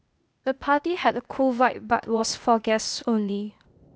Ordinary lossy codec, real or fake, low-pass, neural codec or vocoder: none; fake; none; codec, 16 kHz, 0.8 kbps, ZipCodec